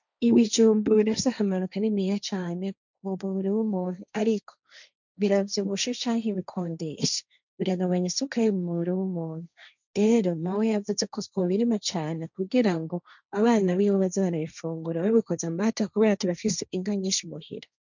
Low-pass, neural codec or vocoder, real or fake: 7.2 kHz; codec, 16 kHz, 1.1 kbps, Voila-Tokenizer; fake